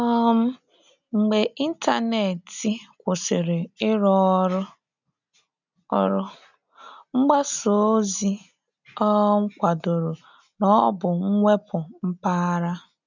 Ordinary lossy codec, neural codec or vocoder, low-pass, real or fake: none; none; 7.2 kHz; real